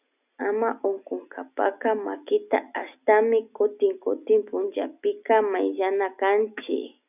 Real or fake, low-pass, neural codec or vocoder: real; 3.6 kHz; none